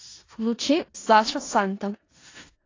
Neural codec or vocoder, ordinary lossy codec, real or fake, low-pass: codec, 16 kHz in and 24 kHz out, 0.4 kbps, LongCat-Audio-Codec, four codebook decoder; AAC, 32 kbps; fake; 7.2 kHz